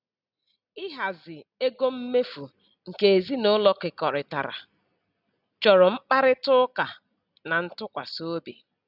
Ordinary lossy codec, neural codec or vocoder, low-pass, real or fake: none; none; 5.4 kHz; real